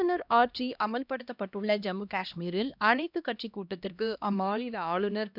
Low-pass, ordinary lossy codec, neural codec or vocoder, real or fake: 5.4 kHz; none; codec, 16 kHz, 1 kbps, X-Codec, HuBERT features, trained on LibriSpeech; fake